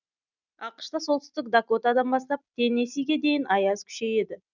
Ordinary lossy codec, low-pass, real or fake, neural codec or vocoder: none; 7.2 kHz; real; none